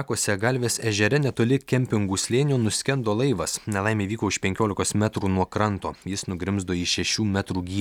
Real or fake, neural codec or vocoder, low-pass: real; none; 19.8 kHz